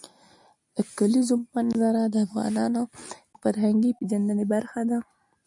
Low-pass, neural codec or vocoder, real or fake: 10.8 kHz; none; real